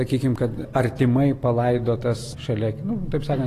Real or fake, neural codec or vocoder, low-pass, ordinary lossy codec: real; none; 14.4 kHz; AAC, 48 kbps